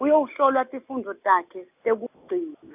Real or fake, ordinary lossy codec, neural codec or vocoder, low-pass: real; none; none; 3.6 kHz